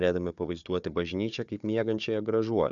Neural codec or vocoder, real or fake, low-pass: codec, 16 kHz, 4 kbps, FunCodec, trained on Chinese and English, 50 frames a second; fake; 7.2 kHz